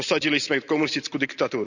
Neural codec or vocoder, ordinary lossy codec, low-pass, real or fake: none; none; 7.2 kHz; real